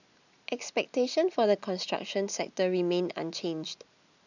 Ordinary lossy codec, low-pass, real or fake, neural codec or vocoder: none; 7.2 kHz; real; none